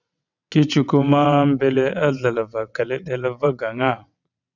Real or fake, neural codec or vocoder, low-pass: fake; vocoder, 22.05 kHz, 80 mel bands, WaveNeXt; 7.2 kHz